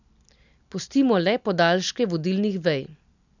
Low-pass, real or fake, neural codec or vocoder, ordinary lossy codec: 7.2 kHz; real; none; none